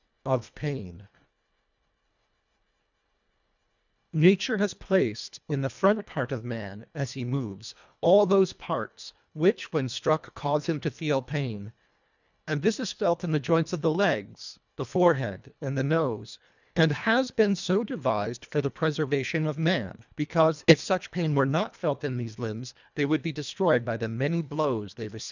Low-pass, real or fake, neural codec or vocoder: 7.2 kHz; fake; codec, 24 kHz, 1.5 kbps, HILCodec